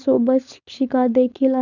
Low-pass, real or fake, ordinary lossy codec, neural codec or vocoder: 7.2 kHz; fake; none; codec, 16 kHz, 4.8 kbps, FACodec